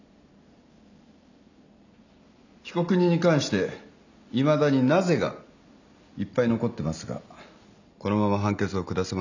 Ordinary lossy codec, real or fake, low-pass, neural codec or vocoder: none; real; 7.2 kHz; none